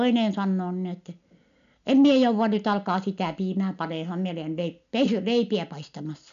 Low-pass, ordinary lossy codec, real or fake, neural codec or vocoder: 7.2 kHz; none; real; none